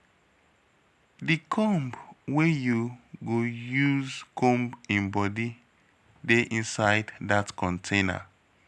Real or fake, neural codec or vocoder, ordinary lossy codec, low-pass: real; none; none; none